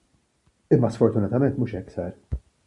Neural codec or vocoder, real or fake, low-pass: none; real; 10.8 kHz